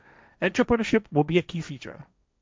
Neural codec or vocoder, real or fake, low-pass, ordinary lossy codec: codec, 16 kHz, 1.1 kbps, Voila-Tokenizer; fake; none; none